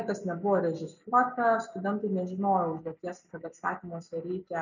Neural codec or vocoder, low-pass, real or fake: none; 7.2 kHz; real